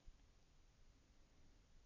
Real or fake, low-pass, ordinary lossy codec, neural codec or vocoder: real; 7.2 kHz; none; none